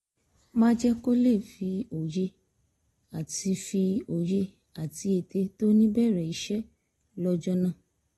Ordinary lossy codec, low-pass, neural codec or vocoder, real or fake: AAC, 32 kbps; 19.8 kHz; none; real